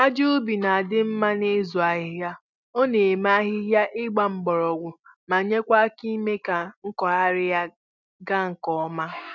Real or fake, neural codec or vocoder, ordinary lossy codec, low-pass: real; none; none; 7.2 kHz